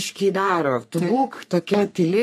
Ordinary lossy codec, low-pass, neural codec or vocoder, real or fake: MP3, 64 kbps; 14.4 kHz; codec, 44.1 kHz, 3.4 kbps, Pupu-Codec; fake